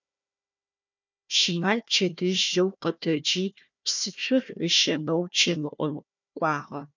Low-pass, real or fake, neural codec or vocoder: 7.2 kHz; fake; codec, 16 kHz, 1 kbps, FunCodec, trained on Chinese and English, 50 frames a second